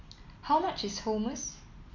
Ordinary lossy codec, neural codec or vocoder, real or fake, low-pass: none; none; real; 7.2 kHz